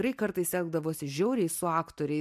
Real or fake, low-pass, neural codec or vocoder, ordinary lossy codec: real; 14.4 kHz; none; MP3, 96 kbps